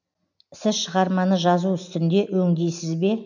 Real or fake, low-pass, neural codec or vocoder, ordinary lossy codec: real; 7.2 kHz; none; none